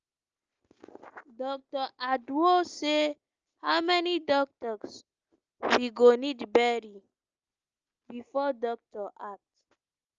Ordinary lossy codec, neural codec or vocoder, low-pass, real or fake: Opus, 24 kbps; none; 7.2 kHz; real